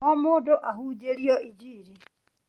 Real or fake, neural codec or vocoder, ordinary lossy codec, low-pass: real; none; Opus, 24 kbps; 19.8 kHz